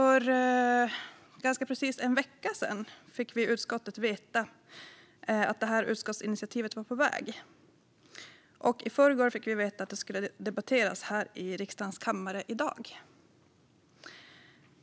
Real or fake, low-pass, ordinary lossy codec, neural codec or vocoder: real; none; none; none